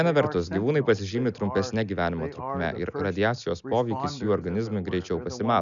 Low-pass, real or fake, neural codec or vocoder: 7.2 kHz; real; none